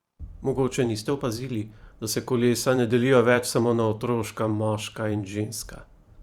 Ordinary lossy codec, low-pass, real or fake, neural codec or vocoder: Opus, 64 kbps; 19.8 kHz; fake; vocoder, 44.1 kHz, 128 mel bands every 512 samples, BigVGAN v2